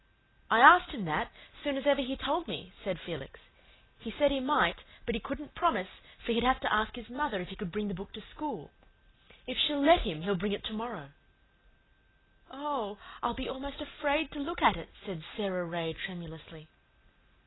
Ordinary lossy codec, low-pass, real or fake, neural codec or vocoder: AAC, 16 kbps; 7.2 kHz; real; none